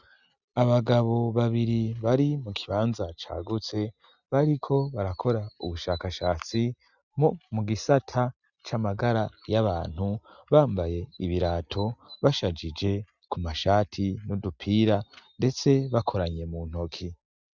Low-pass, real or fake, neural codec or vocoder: 7.2 kHz; real; none